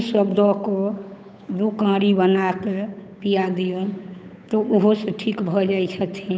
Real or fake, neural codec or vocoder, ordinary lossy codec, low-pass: fake; codec, 16 kHz, 8 kbps, FunCodec, trained on Chinese and English, 25 frames a second; none; none